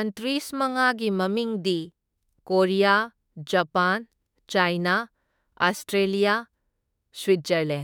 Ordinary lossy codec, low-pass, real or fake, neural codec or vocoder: none; 19.8 kHz; fake; autoencoder, 48 kHz, 32 numbers a frame, DAC-VAE, trained on Japanese speech